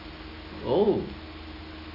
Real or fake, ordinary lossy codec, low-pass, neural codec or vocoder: real; none; 5.4 kHz; none